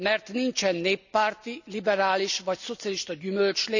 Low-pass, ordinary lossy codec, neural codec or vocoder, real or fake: 7.2 kHz; none; none; real